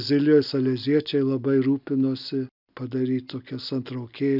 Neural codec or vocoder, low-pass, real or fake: none; 5.4 kHz; real